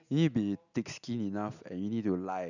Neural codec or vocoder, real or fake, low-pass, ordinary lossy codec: none; real; 7.2 kHz; none